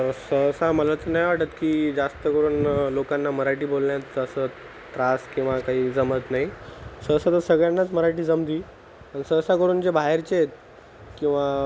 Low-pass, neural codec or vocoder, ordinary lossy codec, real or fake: none; none; none; real